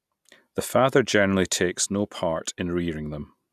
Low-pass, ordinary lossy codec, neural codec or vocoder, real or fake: 14.4 kHz; none; none; real